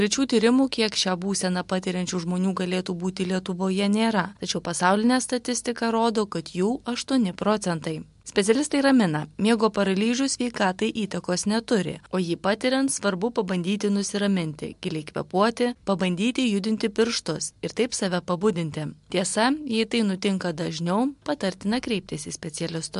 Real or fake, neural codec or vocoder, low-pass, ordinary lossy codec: real; none; 10.8 kHz; MP3, 64 kbps